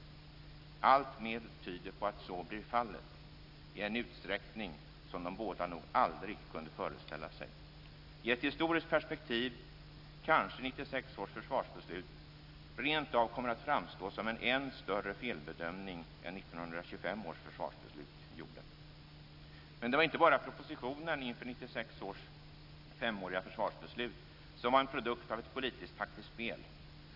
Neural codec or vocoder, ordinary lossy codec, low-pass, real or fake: none; none; 5.4 kHz; real